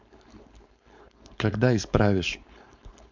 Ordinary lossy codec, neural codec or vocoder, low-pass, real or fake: MP3, 64 kbps; codec, 16 kHz, 4.8 kbps, FACodec; 7.2 kHz; fake